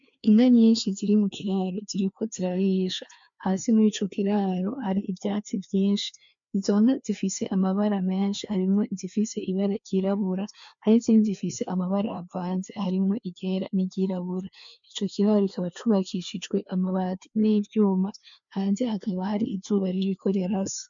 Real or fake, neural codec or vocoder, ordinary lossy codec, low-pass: fake; codec, 16 kHz, 2 kbps, FreqCodec, larger model; MP3, 64 kbps; 7.2 kHz